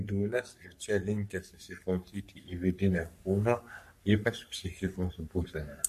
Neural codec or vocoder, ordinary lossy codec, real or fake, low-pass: codec, 44.1 kHz, 3.4 kbps, Pupu-Codec; MP3, 64 kbps; fake; 14.4 kHz